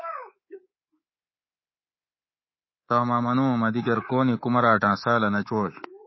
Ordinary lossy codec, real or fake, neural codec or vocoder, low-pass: MP3, 24 kbps; fake; codec, 24 kHz, 3.1 kbps, DualCodec; 7.2 kHz